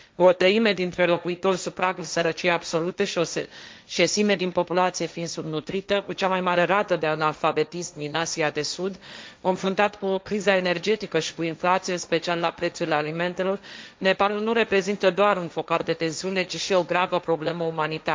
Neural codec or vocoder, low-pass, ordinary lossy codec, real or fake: codec, 16 kHz, 1.1 kbps, Voila-Tokenizer; none; none; fake